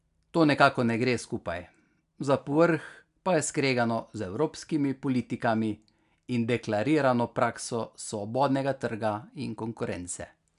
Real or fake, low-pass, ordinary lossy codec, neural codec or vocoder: real; 10.8 kHz; none; none